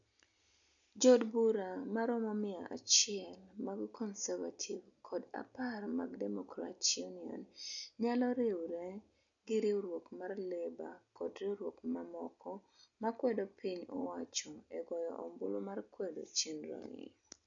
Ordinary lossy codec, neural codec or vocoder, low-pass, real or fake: none; none; 7.2 kHz; real